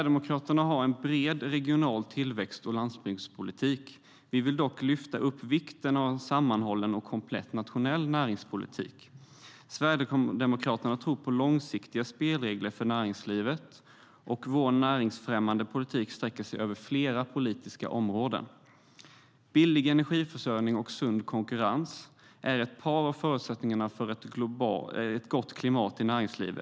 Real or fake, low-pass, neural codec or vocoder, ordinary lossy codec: real; none; none; none